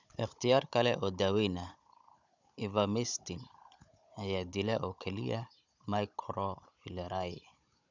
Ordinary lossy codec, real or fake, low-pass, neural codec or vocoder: none; fake; 7.2 kHz; codec, 16 kHz, 16 kbps, FunCodec, trained on Chinese and English, 50 frames a second